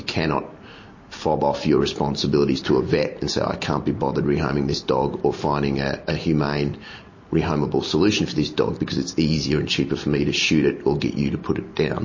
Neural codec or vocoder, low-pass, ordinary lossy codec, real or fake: none; 7.2 kHz; MP3, 32 kbps; real